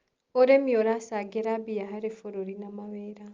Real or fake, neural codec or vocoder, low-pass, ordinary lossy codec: real; none; 7.2 kHz; Opus, 32 kbps